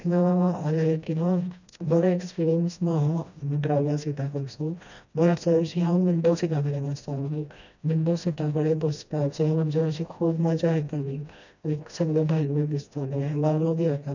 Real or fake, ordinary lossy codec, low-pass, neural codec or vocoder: fake; none; 7.2 kHz; codec, 16 kHz, 1 kbps, FreqCodec, smaller model